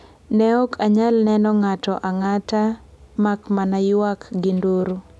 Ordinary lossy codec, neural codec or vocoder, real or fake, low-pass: none; none; real; none